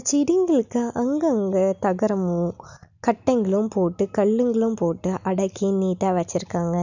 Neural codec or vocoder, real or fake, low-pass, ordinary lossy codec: none; real; 7.2 kHz; none